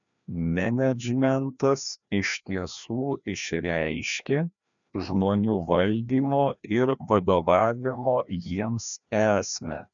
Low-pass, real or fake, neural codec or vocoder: 7.2 kHz; fake; codec, 16 kHz, 1 kbps, FreqCodec, larger model